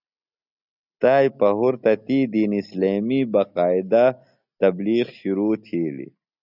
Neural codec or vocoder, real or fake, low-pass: none; real; 5.4 kHz